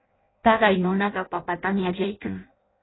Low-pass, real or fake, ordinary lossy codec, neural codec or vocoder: 7.2 kHz; fake; AAC, 16 kbps; codec, 16 kHz in and 24 kHz out, 0.6 kbps, FireRedTTS-2 codec